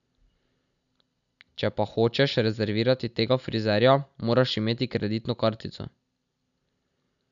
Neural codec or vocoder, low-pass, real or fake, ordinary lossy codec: none; 7.2 kHz; real; none